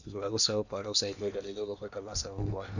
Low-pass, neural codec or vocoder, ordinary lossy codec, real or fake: 7.2 kHz; codec, 16 kHz in and 24 kHz out, 0.8 kbps, FocalCodec, streaming, 65536 codes; none; fake